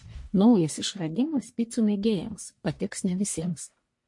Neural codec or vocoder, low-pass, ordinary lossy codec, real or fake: codec, 44.1 kHz, 1.7 kbps, Pupu-Codec; 10.8 kHz; MP3, 48 kbps; fake